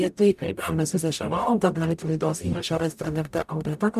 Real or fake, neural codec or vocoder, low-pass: fake; codec, 44.1 kHz, 0.9 kbps, DAC; 14.4 kHz